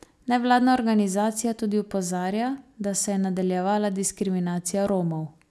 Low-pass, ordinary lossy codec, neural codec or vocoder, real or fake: none; none; none; real